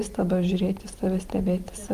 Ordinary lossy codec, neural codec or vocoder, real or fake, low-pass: Opus, 32 kbps; vocoder, 44.1 kHz, 128 mel bands every 512 samples, BigVGAN v2; fake; 14.4 kHz